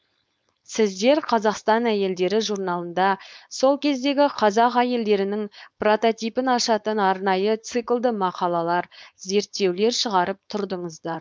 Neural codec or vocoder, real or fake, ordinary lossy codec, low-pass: codec, 16 kHz, 4.8 kbps, FACodec; fake; none; none